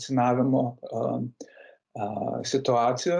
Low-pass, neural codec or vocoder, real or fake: 9.9 kHz; none; real